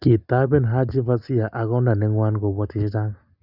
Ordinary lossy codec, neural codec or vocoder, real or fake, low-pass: Opus, 64 kbps; none; real; 5.4 kHz